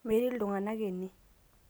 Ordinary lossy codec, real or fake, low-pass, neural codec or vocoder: none; real; none; none